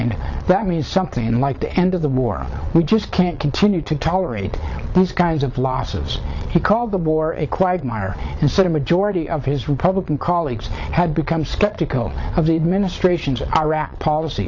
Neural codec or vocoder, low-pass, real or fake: vocoder, 22.05 kHz, 80 mel bands, Vocos; 7.2 kHz; fake